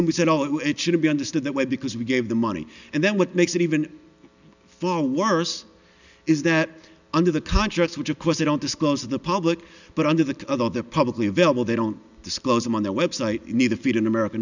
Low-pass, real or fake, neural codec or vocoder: 7.2 kHz; real; none